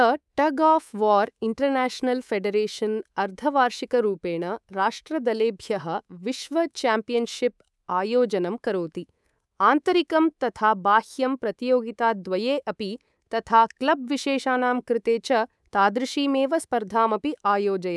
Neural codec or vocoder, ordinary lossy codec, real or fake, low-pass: codec, 24 kHz, 3.1 kbps, DualCodec; none; fake; none